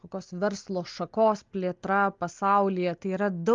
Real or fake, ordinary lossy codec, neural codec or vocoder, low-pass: real; Opus, 32 kbps; none; 7.2 kHz